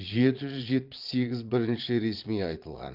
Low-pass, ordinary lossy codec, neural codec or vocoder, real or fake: 5.4 kHz; Opus, 32 kbps; vocoder, 22.05 kHz, 80 mel bands, Vocos; fake